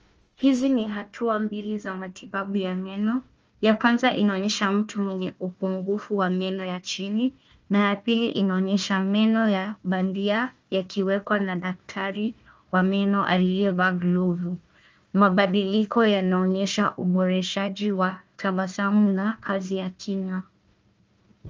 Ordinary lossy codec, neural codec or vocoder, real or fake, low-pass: Opus, 24 kbps; codec, 16 kHz, 1 kbps, FunCodec, trained on Chinese and English, 50 frames a second; fake; 7.2 kHz